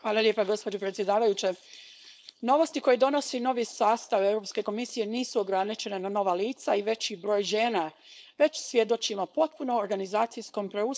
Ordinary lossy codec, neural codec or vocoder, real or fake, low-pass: none; codec, 16 kHz, 4.8 kbps, FACodec; fake; none